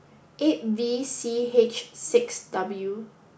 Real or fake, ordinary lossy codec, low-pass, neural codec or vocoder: real; none; none; none